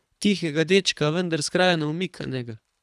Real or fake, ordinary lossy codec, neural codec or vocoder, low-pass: fake; none; codec, 24 kHz, 3 kbps, HILCodec; none